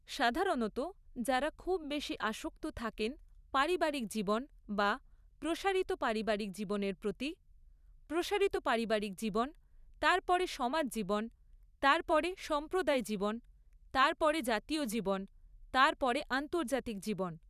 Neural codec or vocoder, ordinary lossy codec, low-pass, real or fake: vocoder, 44.1 kHz, 128 mel bands every 512 samples, BigVGAN v2; none; 14.4 kHz; fake